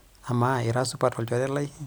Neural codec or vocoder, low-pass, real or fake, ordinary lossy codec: none; none; real; none